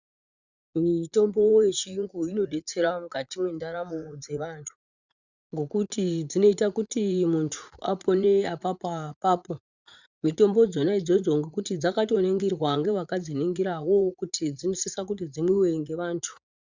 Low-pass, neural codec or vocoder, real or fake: 7.2 kHz; vocoder, 44.1 kHz, 80 mel bands, Vocos; fake